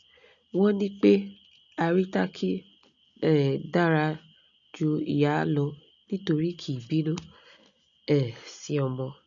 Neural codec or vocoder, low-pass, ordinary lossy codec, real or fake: none; 7.2 kHz; none; real